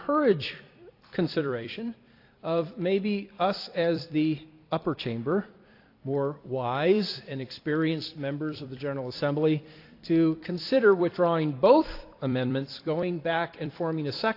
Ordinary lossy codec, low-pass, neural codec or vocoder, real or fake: AAC, 32 kbps; 5.4 kHz; vocoder, 44.1 kHz, 128 mel bands every 256 samples, BigVGAN v2; fake